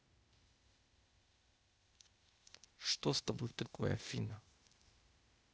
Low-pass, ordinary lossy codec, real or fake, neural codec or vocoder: none; none; fake; codec, 16 kHz, 0.8 kbps, ZipCodec